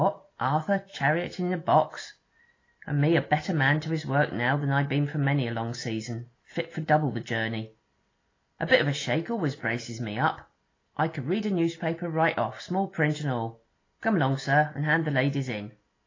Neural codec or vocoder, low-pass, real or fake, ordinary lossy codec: none; 7.2 kHz; real; AAC, 32 kbps